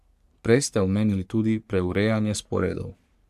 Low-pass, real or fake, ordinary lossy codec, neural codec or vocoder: 14.4 kHz; fake; AAC, 96 kbps; codec, 44.1 kHz, 3.4 kbps, Pupu-Codec